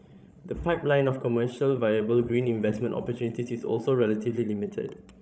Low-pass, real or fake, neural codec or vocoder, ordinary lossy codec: none; fake; codec, 16 kHz, 16 kbps, FreqCodec, larger model; none